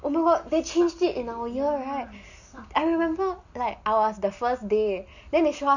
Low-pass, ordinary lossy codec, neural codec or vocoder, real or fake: 7.2 kHz; none; none; real